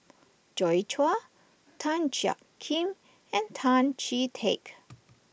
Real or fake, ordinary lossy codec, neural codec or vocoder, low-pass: real; none; none; none